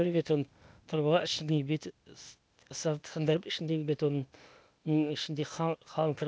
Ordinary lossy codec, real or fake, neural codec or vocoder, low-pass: none; fake; codec, 16 kHz, 0.8 kbps, ZipCodec; none